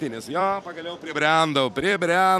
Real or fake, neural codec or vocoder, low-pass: fake; vocoder, 44.1 kHz, 128 mel bands every 256 samples, BigVGAN v2; 14.4 kHz